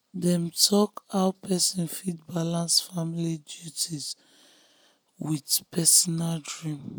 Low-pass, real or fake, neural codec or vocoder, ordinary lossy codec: none; real; none; none